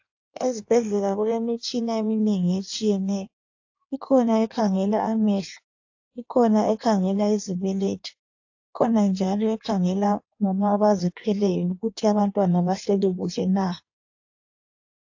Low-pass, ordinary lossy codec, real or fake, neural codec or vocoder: 7.2 kHz; AAC, 48 kbps; fake; codec, 16 kHz in and 24 kHz out, 1.1 kbps, FireRedTTS-2 codec